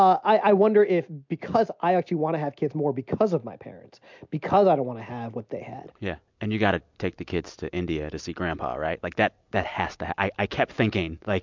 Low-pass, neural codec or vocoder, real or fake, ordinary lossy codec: 7.2 kHz; none; real; MP3, 64 kbps